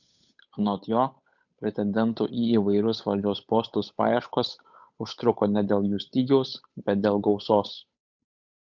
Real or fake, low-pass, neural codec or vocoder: fake; 7.2 kHz; codec, 16 kHz, 8 kbps, FunCodec, trained on Chinese and English, 25 frames a second